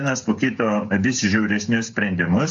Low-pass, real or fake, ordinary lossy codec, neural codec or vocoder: 7.2 kHz; fake; AAC, 64 kbps; codec, 16 kHz, 8 kbps, FreqCodec, smaller model